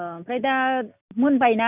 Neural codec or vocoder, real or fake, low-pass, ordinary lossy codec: none; real; 3.6 kHz; none